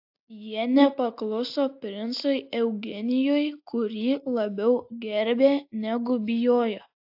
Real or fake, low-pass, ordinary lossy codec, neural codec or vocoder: real; 5.4 kHz; AAC, 48 kbps; none